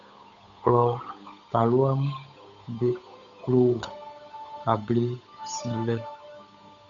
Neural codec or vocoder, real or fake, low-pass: codec, 16 kHz, 8 kbps, FunCodec, trained on Chinese and English, 25 frames a second; fake; 7.2 kHz